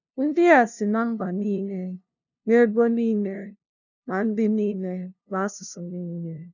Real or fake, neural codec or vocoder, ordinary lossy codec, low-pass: fake; codec, 16 kHz, 0.5 kbps, FunCodec, trained on LibriTTS, 25 frames a second; none; 7.2 kHz